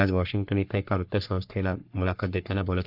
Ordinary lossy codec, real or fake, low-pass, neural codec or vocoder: none; fake; 5.4 kHz; codec, 44.1 kHz, 3.4 kbps, Pupu-Codec